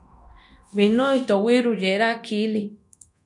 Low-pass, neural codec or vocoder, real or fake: 10.8 kHz; codec, 24 kHz, 0.9 kbps, DualCodec; fake